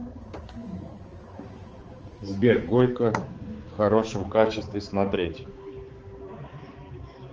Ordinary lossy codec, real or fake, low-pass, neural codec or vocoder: Opus, 24 kbps; fake; 7.2 kHz; codec, 16 kHz, 4 kbps, X-Codec, HuBERT features, trained on balanced general audio